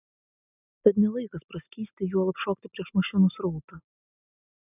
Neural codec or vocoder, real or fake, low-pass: none; real; 3.6 kHz